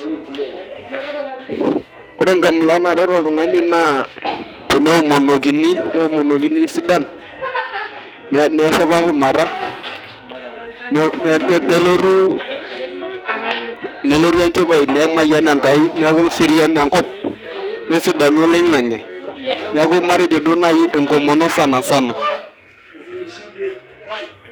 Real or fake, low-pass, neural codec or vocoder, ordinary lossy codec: fake; none; codec, 44.1 kHz, 2.6 kbps, SNAC; none